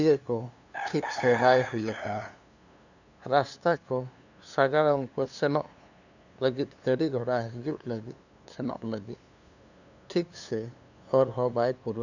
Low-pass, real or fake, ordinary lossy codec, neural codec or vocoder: 7.2 kHz; fake; none; codec, 16 kHz, 2 kbps, FunCodec, trained on LibriTTS, 25 frames a second